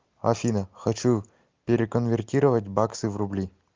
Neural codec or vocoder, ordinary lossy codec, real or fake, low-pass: none; Opus, 32 kbps; real; 7.2 kHz